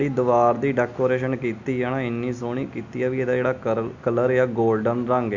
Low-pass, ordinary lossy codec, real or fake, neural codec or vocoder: 7.2 kHz; none; real; none